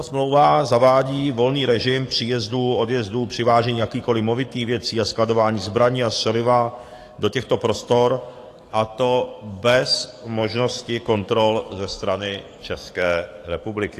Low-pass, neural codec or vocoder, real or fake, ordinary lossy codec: 14.4 kHz; codec, 44.1 kHz, 7.8 kbps, DAC; fake; AAC, 48 kbps